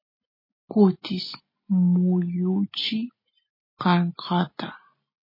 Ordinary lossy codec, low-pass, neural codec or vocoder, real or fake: MP3, 24 kbps; 5.4 kHz; none; real